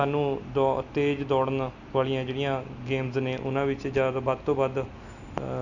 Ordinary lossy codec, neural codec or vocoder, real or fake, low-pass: none; none; real; 7.2 kHz